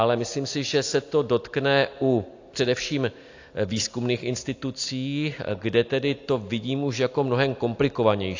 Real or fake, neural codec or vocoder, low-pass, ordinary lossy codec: real; none; 7.2 kHz; AAC, 48 kbps